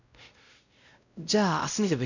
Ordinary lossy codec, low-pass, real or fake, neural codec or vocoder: none; 7.2 kHz; fake; codec, 16 kHz, 0.5 kbps, X-Codec, WavLM features, trained on Multilingual LibriSpeech